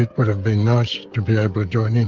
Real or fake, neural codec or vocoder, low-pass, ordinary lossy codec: fake; codec, 16 kHz, 16 kbps, FreqCodec, smaller model; 7.2 kHz; Opus, 32 kbps